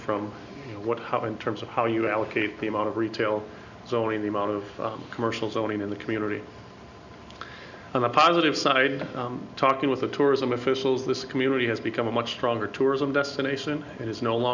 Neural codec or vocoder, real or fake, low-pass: none; real; 7.2 kHz